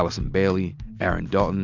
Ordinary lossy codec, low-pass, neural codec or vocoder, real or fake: Opus, 64 kbps; 7.2 kHz; none; real